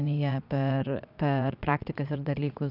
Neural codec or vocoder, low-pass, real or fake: vocoder, 22.05 kHz, 80 mel bands, Vocos; 5.4 kHz; fake